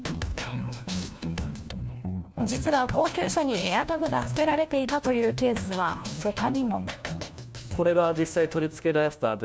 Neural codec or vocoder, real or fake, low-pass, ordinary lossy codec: codec, 16 kHz, 1 kbps, FunCodec, trained on LibriTTS, 50 frames a second; fake; none; none